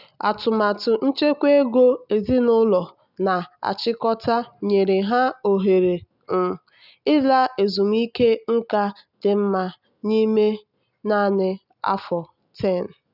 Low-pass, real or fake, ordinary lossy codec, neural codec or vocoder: 5.4 kHz; real; none; none